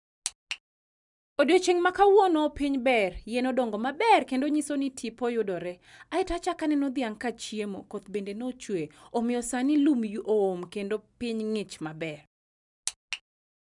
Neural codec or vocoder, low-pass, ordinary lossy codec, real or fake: none; 10.8 kHz; none; real